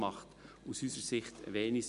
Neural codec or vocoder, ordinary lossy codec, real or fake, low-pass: none; none; real; 10.8 kHz